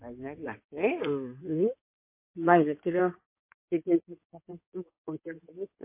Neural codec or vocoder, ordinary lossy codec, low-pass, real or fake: codec, 16 kHz in and 24 kHz out, 2.2 kbps, FireRedTTS-2 codec; MP3, 24 kbps; 3.6 kHz; fake